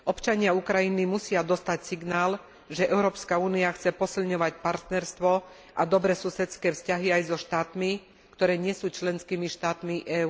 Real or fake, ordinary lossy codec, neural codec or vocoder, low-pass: real; none; none; none